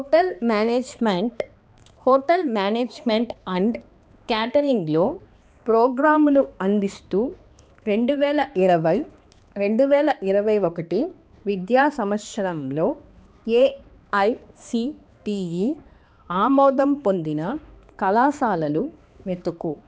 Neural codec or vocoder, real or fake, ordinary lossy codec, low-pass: codec, 16 kHz, 2 kbps, X-Codec, HuBERT features, trained on balanced general audio; fake; none; none